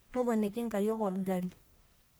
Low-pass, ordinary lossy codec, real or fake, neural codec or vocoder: none; none; fake; codec, 44.1 kHz, 1.7 kbps, Pupu-Codec